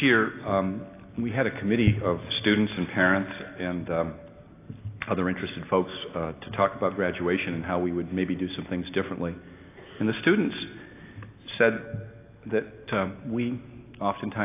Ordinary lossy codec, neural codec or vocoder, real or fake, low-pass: AAC, 24 kbps; none; real; 3.6 kHz